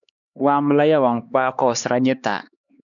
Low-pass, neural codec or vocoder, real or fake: 7.2 kHz; codec, 16 kHz, 2 kbps, X-Codec, HuBERT features, trained on LibriSpeech; fake